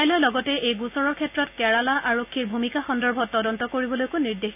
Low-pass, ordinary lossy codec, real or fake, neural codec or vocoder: 3.6 kHz; none; real; none